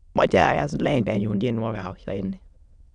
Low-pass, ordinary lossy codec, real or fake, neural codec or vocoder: 9.9 kHz; none; fake; autoencoder, 22.05 kHz, a latent of 192 numbers a frame, VITS, trained on many speakers